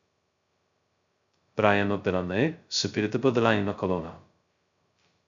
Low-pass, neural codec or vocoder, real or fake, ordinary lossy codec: 7.2 kHz; codec, 16 kHz, 0.2 kbps, FocalCodec; fake; MP3, 96 kbps